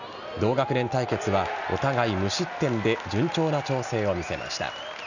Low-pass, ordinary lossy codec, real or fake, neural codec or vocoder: 7.2 kHz; none; real; none